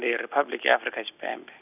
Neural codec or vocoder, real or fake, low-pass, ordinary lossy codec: none; real; 3.6 kHz; none